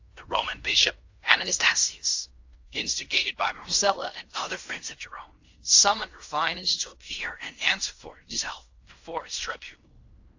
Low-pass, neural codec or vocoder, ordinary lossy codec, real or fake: 7.2 kHz; codec, 16 kHz in and 24 kHz out, 0.4 kbps, LongCat-Audio-Codec, fine tuned four codebook decoder; AAC, 48 kbps; fake